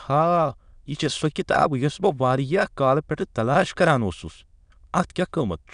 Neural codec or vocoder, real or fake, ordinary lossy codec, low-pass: autoencoder, 22.05 kHz, a latent of 192 numbers a frame, VITS, trained on many speakers; fake; none; 9.9 kHz